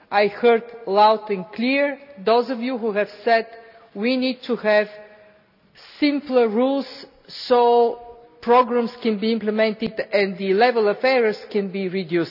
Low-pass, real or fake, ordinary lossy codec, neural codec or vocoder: 5.4 kHz; real; none; none